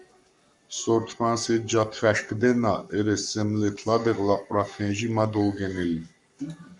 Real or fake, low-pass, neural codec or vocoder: fake; 10.8 kHz; codec, 44.1 kHz, 7.8 kbps, Pupu-Codec